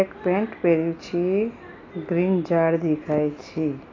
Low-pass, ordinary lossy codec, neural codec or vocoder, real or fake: 7.2 kHz; AAC, 32 kbps; none; real